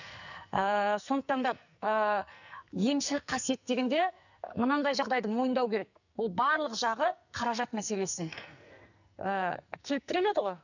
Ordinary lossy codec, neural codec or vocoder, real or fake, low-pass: none; codec, 44.1 kHz, 2.6 kbps, SNAC; fake; 7.2 kHz